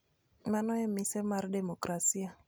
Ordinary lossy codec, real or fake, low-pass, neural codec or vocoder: none; real; none; none